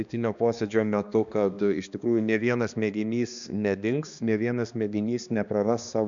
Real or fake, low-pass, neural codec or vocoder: fake; 7.2 kHz; codec, 16 kHz, 2 kbps, X-Codec, HuBERT features, trained on balanced general audio